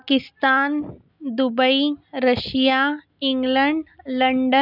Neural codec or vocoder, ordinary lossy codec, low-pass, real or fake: none; none; 5.4 kHz; real